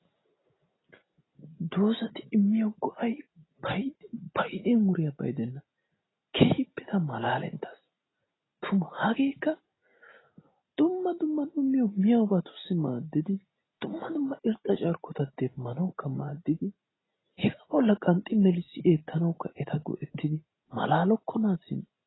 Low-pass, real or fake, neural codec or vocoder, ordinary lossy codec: 7.2 kHz; real; none; AAC, 16 kbps